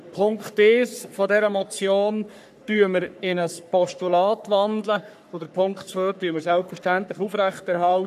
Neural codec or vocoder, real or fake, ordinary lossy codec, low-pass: codec, 44.1 kHz, 3.4 kbps, Pupu-Codec; fake; none; 14.4 kHz